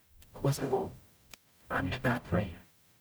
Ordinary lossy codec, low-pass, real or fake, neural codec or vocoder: none; none; fake; codec, 44.1 kHz, 0.9 kbps, DAC